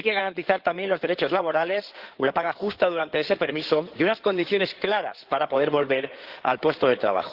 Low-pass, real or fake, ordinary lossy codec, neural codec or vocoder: 5.4 kHz; fake; Opus, 16 kbps; codec, 16 kHz in and 24 kHz out, 2.2 kbps, FireRedTTS-2 codec